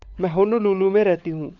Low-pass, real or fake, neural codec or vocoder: 7.2 kHz; fake; codec, 16 kHz, 16 kbps, FunCodec, trained on LibriTTS, 50 frames a second